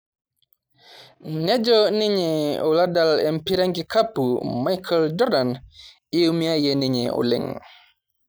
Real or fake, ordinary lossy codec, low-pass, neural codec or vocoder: real; none; none; none